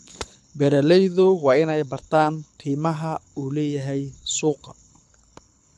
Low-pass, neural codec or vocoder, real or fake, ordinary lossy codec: none; codec, 24 kHz, 6 kbps, HILCodec; fake; none